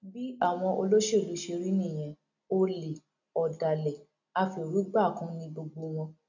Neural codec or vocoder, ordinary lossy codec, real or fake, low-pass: none; none; real; 7.2 kHz